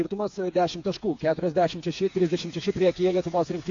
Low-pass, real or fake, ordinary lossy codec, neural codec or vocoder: 7.2 kHz; fake; AAC, 48 kbps; codec, 16 kHz, 4 kbps, FreqCodec, smaller model